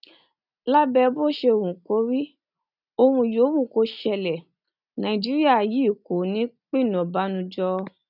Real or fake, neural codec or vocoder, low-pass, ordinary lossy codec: real; none; 5.4 kHz; none